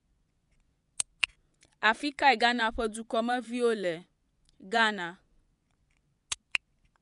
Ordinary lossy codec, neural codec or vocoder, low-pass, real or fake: none; vocoder, 24 kHz, 100 mel bands, Vocos; 10.8 kHz; fake